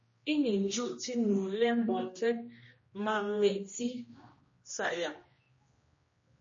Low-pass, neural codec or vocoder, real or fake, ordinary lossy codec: 7.2 kHz; codec, 16 kHz, 1 kbps, X-Codec, HuBERT features, trained on general audio; fake; MP3, 32 kbps